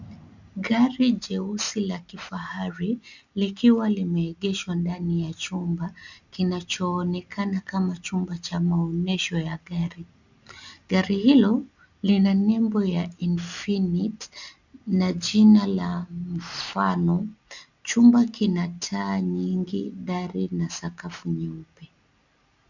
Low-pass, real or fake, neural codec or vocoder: 7.2 kHz; real; none